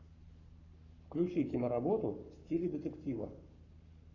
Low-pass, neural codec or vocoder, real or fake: 7.2 kHz; codec, 44.1 kHz, 7.8 kbps, Pupu-Codec; fake